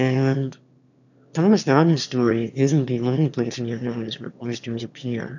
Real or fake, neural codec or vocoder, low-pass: fake; autoencoder, 22.05 kHz, a latent of 192 numbers a frame, VITS, trained on one speaker; 7.2 kHz